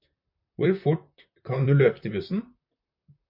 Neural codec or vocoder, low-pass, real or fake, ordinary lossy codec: vocoder, 44.1 kHz, 128 mel bands, Pupu-Vocoder; 5.4 kHz; fake; MP3, 48 kbps